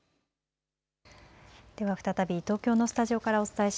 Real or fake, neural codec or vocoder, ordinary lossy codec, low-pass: real; none; none; none